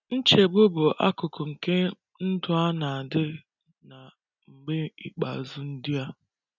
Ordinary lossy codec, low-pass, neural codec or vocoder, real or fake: none; 7.2 kHz; none; real